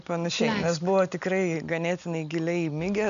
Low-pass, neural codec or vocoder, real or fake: 7.2 kHz; none; real